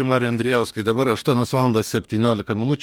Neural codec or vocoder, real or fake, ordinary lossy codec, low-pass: codec, 44.1 kHz, 2.6 kbps, DAC; fake; MP3, 96 kbps; 19.8 kHz